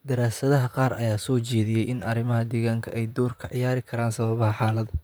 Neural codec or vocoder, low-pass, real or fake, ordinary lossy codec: vocoder, 44.1 kHz, 128 mel bands, Pupu-Vocoder; none; fake; none